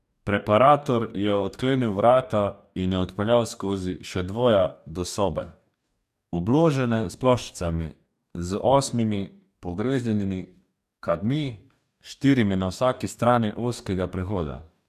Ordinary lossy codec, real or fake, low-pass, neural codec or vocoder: none; fake; 14.4 kHz; codec, 44.1 kHz, 2.6 kbps, DAC